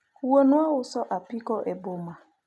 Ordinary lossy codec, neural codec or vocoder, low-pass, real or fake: none; none; none; real